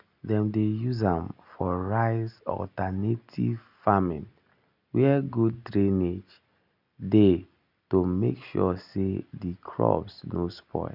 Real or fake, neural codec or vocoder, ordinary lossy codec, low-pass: real; none; none; 5.4 kHz